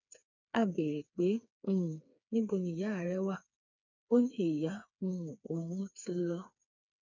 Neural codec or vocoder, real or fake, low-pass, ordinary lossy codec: codec, 16 kHz, 4 kbps, FreqCodec, smaller model; fake; 7.2 kHz; none